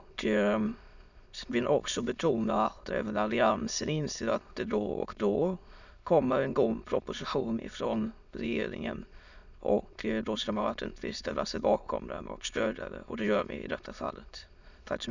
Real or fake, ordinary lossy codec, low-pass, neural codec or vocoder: fake; none; 7.2 kHz; autoencoder, 22.05 kHz, a latent of 192 numbers a frame, VITS, trained on many speakers